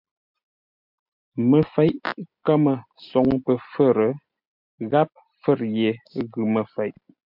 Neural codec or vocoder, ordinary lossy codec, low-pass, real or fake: none; MP3, 48 kbps; 5.4 kHz; real